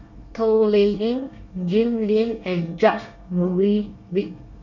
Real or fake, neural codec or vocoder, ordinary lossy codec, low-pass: fake; codec, 24 kHz, 1 kbps, SNAC; none; 7.2 kHz